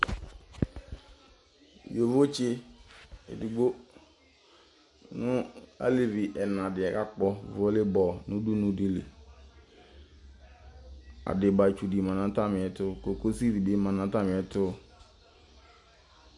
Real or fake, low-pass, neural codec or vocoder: real; 10.8 kHz; none